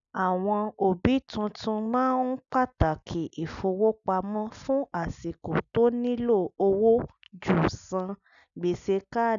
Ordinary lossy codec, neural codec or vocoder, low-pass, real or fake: none; none; 7.2 kHz; real